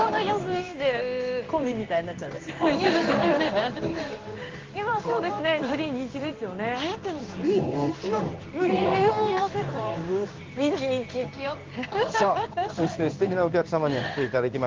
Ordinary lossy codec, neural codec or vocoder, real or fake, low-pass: Opus, 32 kbps; codec, 16 kHz in and 24 kHz out, 1 kbps, XY-Tokenizer; fake; 7.2 kHz